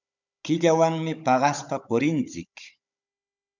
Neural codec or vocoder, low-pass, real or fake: codec, 16 kHz, 16 kbps, FunCodec, trained on Chinese and English, 50 frames a second; 7.2 kHz; fake